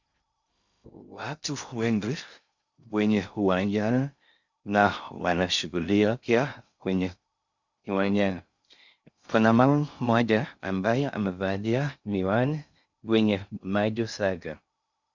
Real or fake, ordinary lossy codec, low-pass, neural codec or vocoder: fake; Opus, 64 kbps; 7.2 kHz; codec, 16 kHz in and 24 kHz out, 0.6 kbps, FocalCodec, streaming, 2048 codes